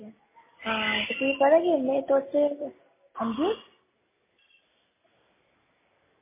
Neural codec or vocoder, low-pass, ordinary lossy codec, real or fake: none; 3.6 kHz; MP3, 16 kbps; real